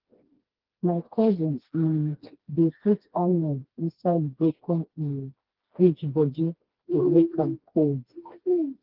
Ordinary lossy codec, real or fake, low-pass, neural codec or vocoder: Opus, 16 kbps; fake; 5.4 kHz; codec, 16 kHz, 2 kbps, FreqCodec, smaller model